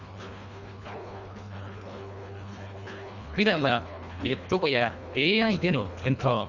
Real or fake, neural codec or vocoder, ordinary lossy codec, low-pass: fake; codec, 24 kHz, 1.5 kbps, HILCodec; Opus, 64 kbps; 7.2 kHz